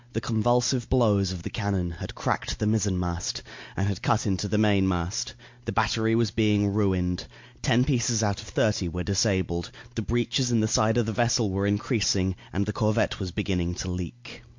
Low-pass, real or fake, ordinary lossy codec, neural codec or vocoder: 7.2 kHz; real; MP3, 48 kbps; none